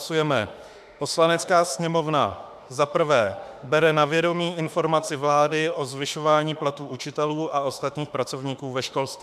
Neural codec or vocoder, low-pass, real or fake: autoencoder, 48 kHz, 32 numbers a frame, DAC-VAE, trained on Japanese speech; 14.4 kHz; fake